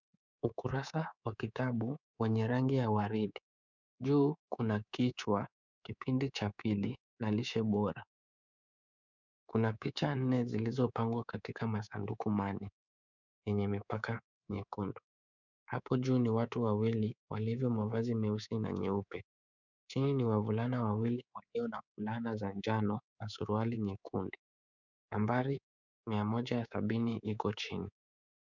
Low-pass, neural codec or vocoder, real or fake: 7.2 kHz; codec, 24 kHz, 3.1 kbps, DualCodec; fake